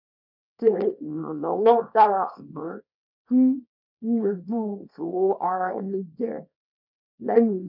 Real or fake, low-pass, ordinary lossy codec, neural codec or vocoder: fake; 5.4 kHz; none; codec, 24 kHz, 0.9 kbps, WavTokenizer, small release